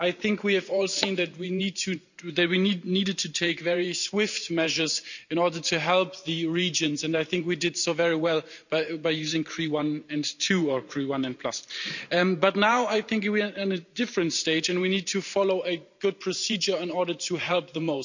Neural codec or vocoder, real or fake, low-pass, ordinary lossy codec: vocoder, 44.1 kHz, 128 mel bands every 512 samples, BigVGAN v2; fake; 7.2 kHz; none